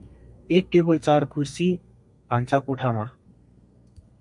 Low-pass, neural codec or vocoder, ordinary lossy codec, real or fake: 10.8 kHz; codec, 32 kHz, 1.9 kbps, SNAC; MP3, 64 kbps; fake